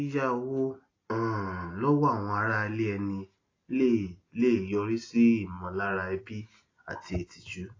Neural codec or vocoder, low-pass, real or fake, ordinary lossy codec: none; 7.2 kHz; real; AAC, 32 kbps